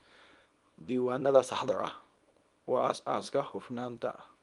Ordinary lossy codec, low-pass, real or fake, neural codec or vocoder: Opus, 32 kbps; 10.8 kHz; fake; codec, 24 kHz, 0.9 kbps, WavTokenizer, small release